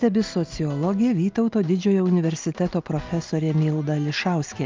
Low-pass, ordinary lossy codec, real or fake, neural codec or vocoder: 7.2 kHz; Opus, 32 kbps; real; none